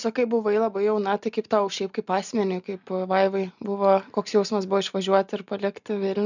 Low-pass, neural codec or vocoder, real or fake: 7.2 kHz; none; real